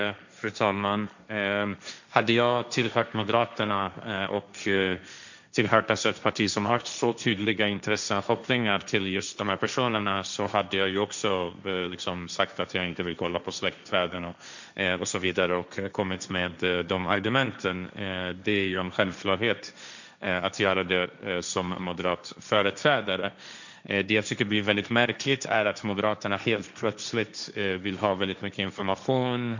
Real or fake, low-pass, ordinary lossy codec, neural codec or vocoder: fake; 7.2 kHz; none; codec, 16 kHz, 1.1 kbps, Voila-Tokenizer